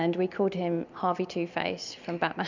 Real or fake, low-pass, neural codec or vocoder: real; 7.2 kHz; none